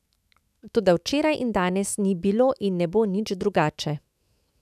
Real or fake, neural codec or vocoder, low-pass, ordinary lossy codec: fake; autoencoder, 48 kHz, 128 numbers a frame, DAC-VAE, trained on Japanese speech; 14.4 kHz; none